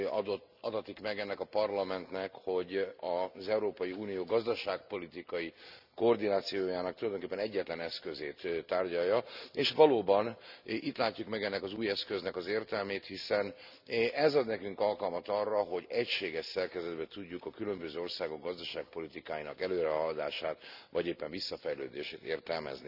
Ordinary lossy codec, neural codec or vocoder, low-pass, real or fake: none; none; 5.4 kHz; real